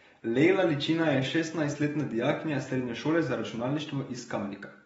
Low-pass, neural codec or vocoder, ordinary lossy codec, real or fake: 19.8 kHz; none; AAC, 24 kbps; real